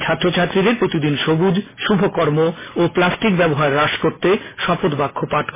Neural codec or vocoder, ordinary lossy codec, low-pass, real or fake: none; MP3, 16 kbps; 3.6 kHz; real